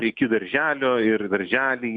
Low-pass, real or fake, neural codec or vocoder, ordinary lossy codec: 9.9 kHz; real; none; Opus, 64 kbps